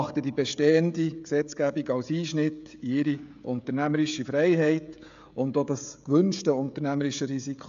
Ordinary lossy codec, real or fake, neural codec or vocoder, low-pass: AAC, 64 kbps; fake; codec, 16 kHz, 16 kbps, FreqCodec, smaller model; 7.2 kHz